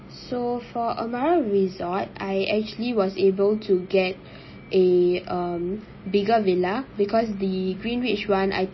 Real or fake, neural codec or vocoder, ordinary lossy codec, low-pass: real; none; MP3, 24 kbps; 7.2 kHz